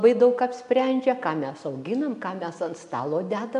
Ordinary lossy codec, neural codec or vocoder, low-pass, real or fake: AAC, 96 kbps; none; 10.8 kHz; real